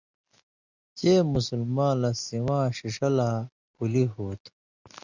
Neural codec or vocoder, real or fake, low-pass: none; real; 7.2 kHz